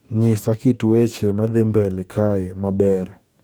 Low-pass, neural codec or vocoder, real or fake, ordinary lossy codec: none; codec, 44.1 kHz, 2.6 kbps, DAC; fake; none